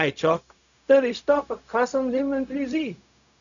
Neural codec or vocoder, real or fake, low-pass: codec, 16 kHz, 0.4 kbps, LongCat-Audio-Codec; fake; 7.2 kHz